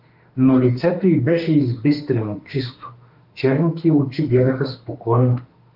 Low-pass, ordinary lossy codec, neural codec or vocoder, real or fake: 5.4 kHz; Opus, 24 kbps; autoencoder, 48 kHz, 32 numbers a frame, DAC-VAE, trained on Japanese speech; fake